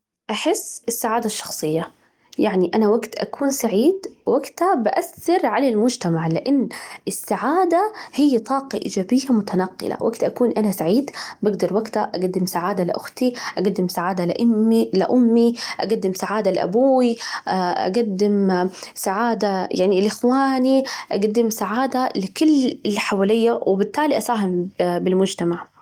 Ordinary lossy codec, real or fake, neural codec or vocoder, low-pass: Opus, 24 kbps; real; none; 19.8 kHz